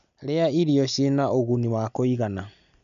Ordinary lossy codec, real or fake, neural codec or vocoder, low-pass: none; real; none; 7.2 kHz